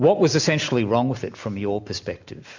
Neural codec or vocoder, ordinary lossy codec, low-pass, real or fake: none; MP3, 64 kbps; 7.2 kHz; real